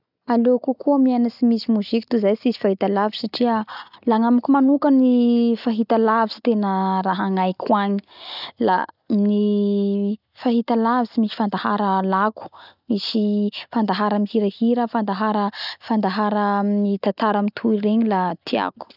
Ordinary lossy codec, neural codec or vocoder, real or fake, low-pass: none; none; real; 5.4 kHz